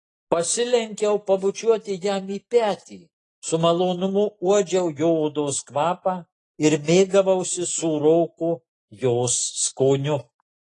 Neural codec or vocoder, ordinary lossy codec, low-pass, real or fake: none; AAC, 32 kbps; 10.8 kHz; real